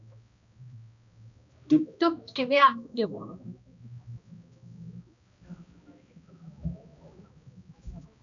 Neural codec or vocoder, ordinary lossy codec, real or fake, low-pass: codec, 16 kHz, 1 kbps, X-Codec, HuBERT features, trained on balanced general audio; MP3, 96 kbps; fake; 7.2 kHz